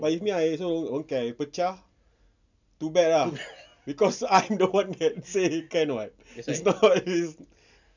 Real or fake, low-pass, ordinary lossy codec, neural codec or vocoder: real; 7.2 kHz; none; none